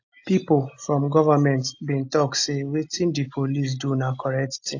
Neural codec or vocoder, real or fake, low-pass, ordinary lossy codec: none; real; 7.2 kHz; AAC, 48 kbps